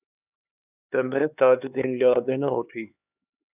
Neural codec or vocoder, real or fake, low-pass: codec, 16 kHz, 2 kbps, X-Codec, HuBERT features, trained on LibriSpeech; fake; 3.6 kHz